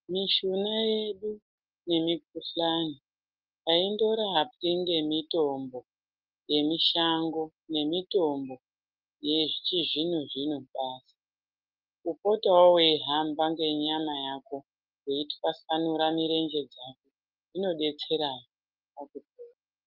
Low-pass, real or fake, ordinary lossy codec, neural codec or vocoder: 5.4 kHz; real; Opus, 32 kbps; none